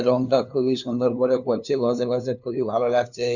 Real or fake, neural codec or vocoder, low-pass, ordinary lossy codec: fake; codec, 16 kHz, 2 kbps, FunCodec, trained on LibriTTS, 25 frames a second; 7.2 kHz; none